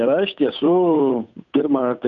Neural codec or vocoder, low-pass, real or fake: codec, 16 kHz, 8 kbps, FunCodec, trained on Chinese and English, 25 frames a second; 7.2 kHz; fake